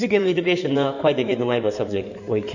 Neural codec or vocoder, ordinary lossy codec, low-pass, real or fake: codec, 16 kHz in and 24 kHz out, 2.2 kbps, FireRedTTS-2 codec; none; 7.2 kHz; fake